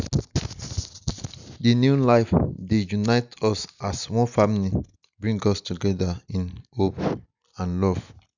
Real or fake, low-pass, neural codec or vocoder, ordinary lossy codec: real; 7.2 kHz; none; none